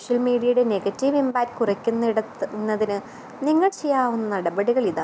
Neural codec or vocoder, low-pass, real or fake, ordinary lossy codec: none; none; real; none